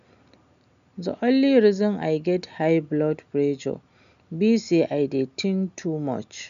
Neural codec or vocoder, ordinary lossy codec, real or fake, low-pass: none; none; real; 7.2 kHz